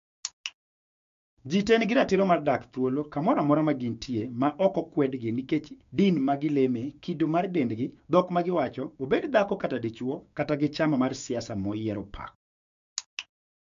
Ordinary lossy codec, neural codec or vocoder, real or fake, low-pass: MP3, 64 kbps; codec, 16 kHz, 6 kbps, DAC; fake; 7.2 kHz